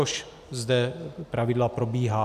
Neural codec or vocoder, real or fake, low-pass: none; real; 14.4 kHz